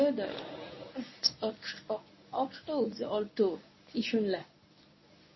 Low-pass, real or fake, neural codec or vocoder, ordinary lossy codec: 7.2 kHz; fake; codec, 24 kHz, 0.9 kbps, WavTokenizer, medium speech release version 1; MP3, 24 kbps